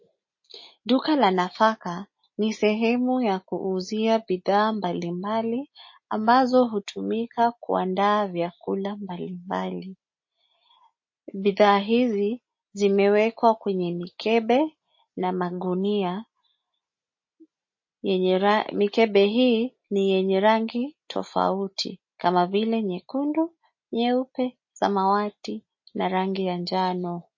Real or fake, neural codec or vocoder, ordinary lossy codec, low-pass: real; none; MP3, 32 kbps; 7.2 kHz